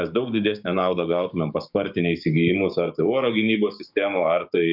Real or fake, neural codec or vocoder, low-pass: fake; codec, 16 kHz, 6 kbps, DAC; 5.4 kHz